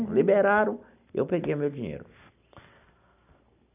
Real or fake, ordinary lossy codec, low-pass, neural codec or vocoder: real; none; 3.6 kHz; none